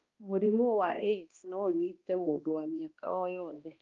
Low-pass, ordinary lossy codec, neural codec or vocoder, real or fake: 7.2 kHz; Opus, 32 kbps; codec, 16 kHz, 1 kbps, X-Codec, HuBERT features, trained on balanced general audio; fake